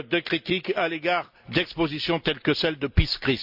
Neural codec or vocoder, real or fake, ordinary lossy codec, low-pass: none; real; Opus, 64 kbps; 5.4 kHz